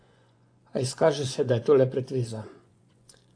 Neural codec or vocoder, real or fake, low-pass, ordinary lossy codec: vocoder, 24 kHz, 100 mel bands, Vocos; fake; 9.9 kHz; AAC, 48 kbps